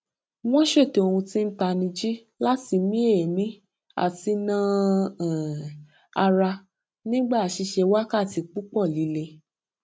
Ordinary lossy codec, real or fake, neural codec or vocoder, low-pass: none; real; none; none